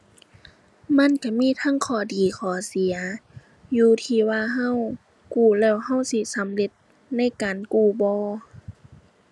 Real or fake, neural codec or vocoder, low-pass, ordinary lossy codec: real; none; none; none